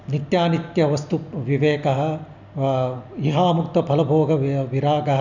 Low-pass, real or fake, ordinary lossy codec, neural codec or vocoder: 7.2 kHz; real; none; none